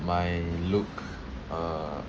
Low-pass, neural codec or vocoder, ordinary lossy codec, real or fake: 7.2 kHz; none; Opus, 24 kbps; real